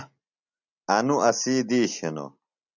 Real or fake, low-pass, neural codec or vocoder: real; 7.2 kHz; none